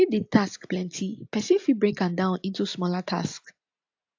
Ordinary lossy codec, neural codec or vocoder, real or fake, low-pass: AAC, 48 kbps; none; real; 7.2 kHz